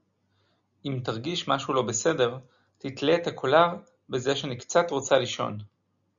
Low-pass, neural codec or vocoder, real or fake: 7.2 kHz; none; real